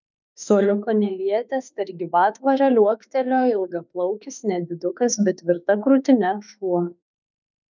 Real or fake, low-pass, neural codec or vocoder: fake; 7.2 kHz; autoencoder, 48 kHz, 32 numbers a frame, DAC-VAE, trained on Japanese speech